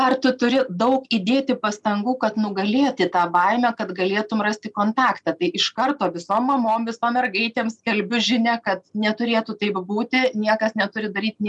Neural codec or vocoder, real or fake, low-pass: none; real; 10.8 kHz